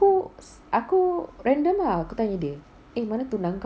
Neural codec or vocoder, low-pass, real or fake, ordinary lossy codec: none; none; real; none